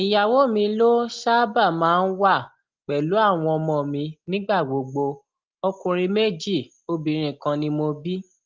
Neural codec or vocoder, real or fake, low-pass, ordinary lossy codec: none; real; 7.2 kHz; Opus, 32 kbps